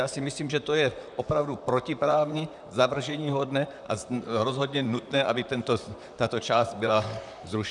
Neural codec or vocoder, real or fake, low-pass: vocoder, 44.1 kHz, 128 mel bands, Pupu-Vocoder; fake; 10.8 kHz